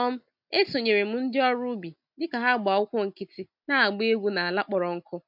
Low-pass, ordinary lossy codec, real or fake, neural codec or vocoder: 5.4 kHz; MP3, 48 kbps; real; none